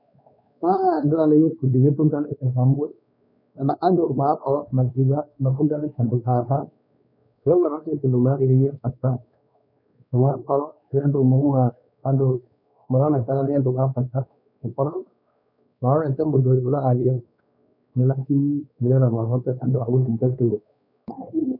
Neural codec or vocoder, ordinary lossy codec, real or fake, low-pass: codec, 16 kHz, 2 kbps, X-Codec, WavLM features, trained on Multilingual LibriSpeech; none; fake; 5.4 kHz